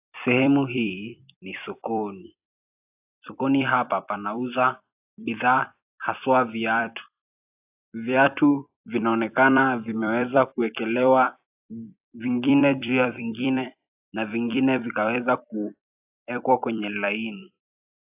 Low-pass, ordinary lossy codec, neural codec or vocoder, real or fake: 3.6 kHz; AAC, 32 kbps; vocoder, 44.1 kHz, 128 mel bands every 256 samples, BigVGAN v2; fake